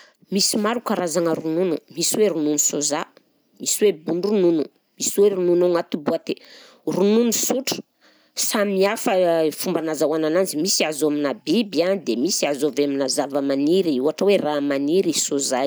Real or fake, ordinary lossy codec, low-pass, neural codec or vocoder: real; none; none; none